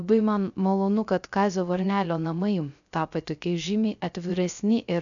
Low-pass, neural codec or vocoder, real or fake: 7.2 kHz; codec, 16 kHz, 0.3 kbps, FocalCodec; fake